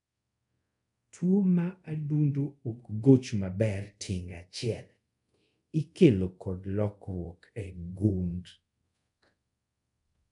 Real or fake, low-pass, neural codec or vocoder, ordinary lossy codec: fake; 10.8 kHz; codec, 24 kHz, 0.5 kbps, DualCodec; none